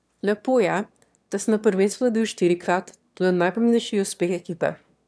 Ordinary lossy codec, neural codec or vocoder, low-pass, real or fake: none; autoencoder, 22.05 kHz, a latent of 192 numbers a frame, VITS, trained on one speaker; none; fake